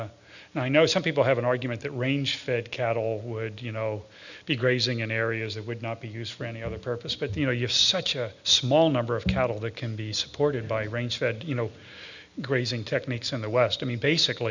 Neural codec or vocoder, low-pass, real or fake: none; 7.2 kHz; real